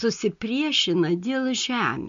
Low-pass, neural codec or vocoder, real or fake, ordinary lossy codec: 7.2 kHz; none; real; MP3, 48 kbps